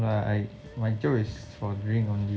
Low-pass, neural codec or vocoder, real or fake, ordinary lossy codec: none; none; real; none